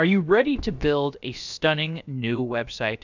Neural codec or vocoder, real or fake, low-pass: codec, 16 kHz, about 1 kbps, DyCAST, with the encoder's durations; fake; 7.2 kHz